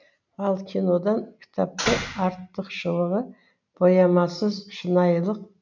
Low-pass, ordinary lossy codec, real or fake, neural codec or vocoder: 7.2 kHz; none; real; none